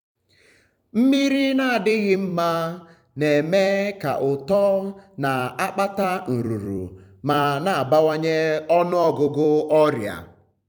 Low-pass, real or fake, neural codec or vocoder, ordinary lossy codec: 19.8 kHz; fake; vocoder, 44.1 kHz, 128 mel bands every 256 samples, BigVGAN v2; none